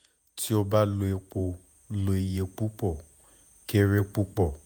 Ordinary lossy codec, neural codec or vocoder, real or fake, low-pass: none; vocoder, 48 kHz, 128 mel bands, Vocos; fake; none